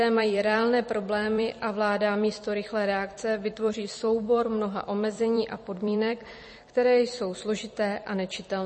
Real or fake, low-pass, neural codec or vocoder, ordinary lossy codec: fake; 10.8 kHz; vocoder, 44.1 kHz, 128 mel bands every 256 samples, BigVGAN v2; MP3, 32 kbps